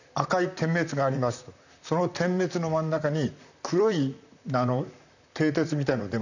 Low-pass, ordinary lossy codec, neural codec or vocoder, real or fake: 7.2 kHz; none; vocoder, 44.1 kHz, 128 mel bands, Pupu-Vocoder; fake